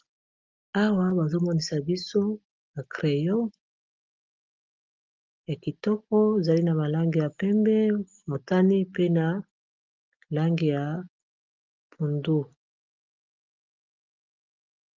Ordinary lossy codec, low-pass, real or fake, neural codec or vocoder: Opus, 32 kbps; 7.2 kHz; real; none